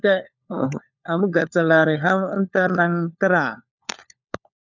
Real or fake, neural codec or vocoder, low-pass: fake; codec, 16 kHz, 4 kbps, FunCodec, trained on LibriTTS, 50 frames a second; 7.2 kHz